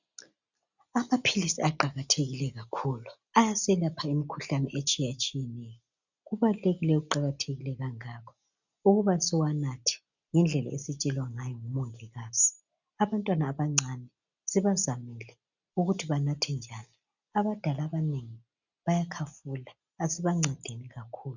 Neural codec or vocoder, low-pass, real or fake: none; 7.2 kHz; real